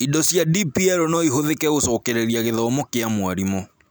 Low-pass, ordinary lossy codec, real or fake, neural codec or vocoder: none; none; real; none